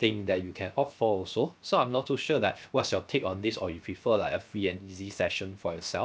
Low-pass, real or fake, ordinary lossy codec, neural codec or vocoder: none; fake; none; codec, 16 kHz, 0.7 kbps, FocalCodec